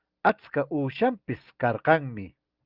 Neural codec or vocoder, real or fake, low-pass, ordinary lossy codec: none; real; 5.4 kHz; Opus, 24 kbps